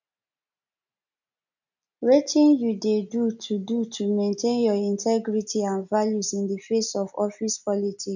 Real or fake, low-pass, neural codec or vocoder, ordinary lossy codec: real; 7.2 kHz; none; none